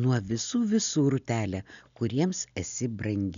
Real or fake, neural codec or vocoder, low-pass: real; none; 7.2 kHz